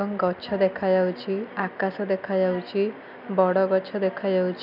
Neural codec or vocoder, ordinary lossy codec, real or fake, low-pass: none; none; real; 5.4 kHz